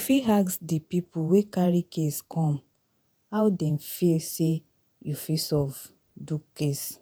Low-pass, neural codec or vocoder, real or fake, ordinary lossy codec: none; vocoder, 48 kHz, 128 mel bands, Vocos; fake; none